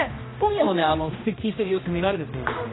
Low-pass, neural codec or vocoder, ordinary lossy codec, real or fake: 7.2 kHz; codec, 16 kHz, 1 kbps, X-Codec, HuBERT features, trained on general audio; AAC, 16 kbps; fake